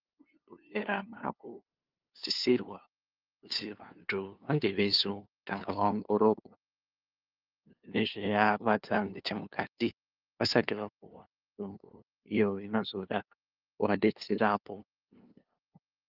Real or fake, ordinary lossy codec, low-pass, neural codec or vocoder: fake; Opus, 24 kbps; 5.4 kHz; codec, 16 kHz, 2 kbps, FunCodec, trained on LibriTTS, 25 frames a second